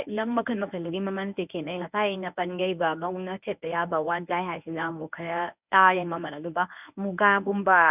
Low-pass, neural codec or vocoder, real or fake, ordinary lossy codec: 3.6 kHz; codec, 24 kHz, 0.9 kbps, WavTokenizer, medium speech release version 1; fake; none